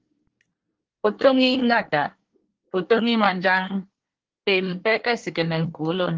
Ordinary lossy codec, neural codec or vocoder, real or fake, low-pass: Opus, 16 kbps; codec, 24 kHz, 1 kbps, SNAC; fake; 7.2 kHz